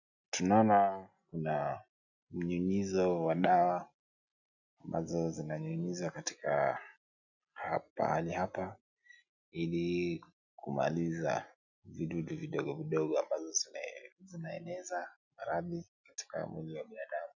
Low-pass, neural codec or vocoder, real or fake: 7.2 kHz; none; real